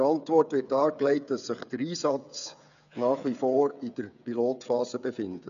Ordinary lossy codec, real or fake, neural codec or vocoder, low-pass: none; fake; codec, 16 kHz, 8 kbps, FreqCodec, smaller model; 7.2 kHz